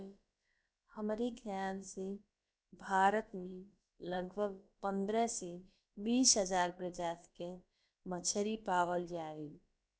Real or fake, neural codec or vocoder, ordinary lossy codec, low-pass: fake; codec, 16 kHz, about 1 kbps, DyCAST, with the encoder's durations; none; none